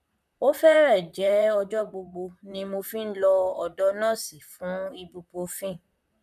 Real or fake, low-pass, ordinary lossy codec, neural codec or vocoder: fake; 14.4 kHz; none; vocoder, 44.1 kHz, 128 mel bands, Pupu-Vocoder